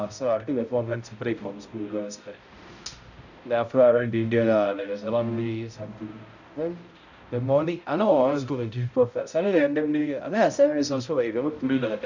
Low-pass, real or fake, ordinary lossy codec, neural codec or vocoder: 7.2 kHz; fake; none; codec, 16 kHz, 0.5 kbps, X-Codec, HuBERT features, trained on balanced general audio